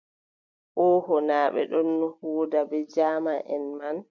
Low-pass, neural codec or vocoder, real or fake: 7.2 kHz; none; real